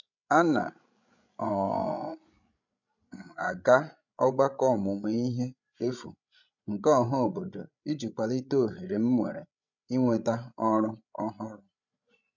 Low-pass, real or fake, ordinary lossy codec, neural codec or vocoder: 7.2 kHz; fake; none; codec, 16 kHz, 16 kbps, FreqCodec, larger model